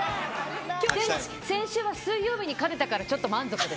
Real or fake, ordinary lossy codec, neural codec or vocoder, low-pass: real; none; none; none